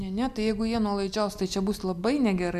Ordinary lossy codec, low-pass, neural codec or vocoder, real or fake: MP3, 96 kbps; 14.4 kHz; none; real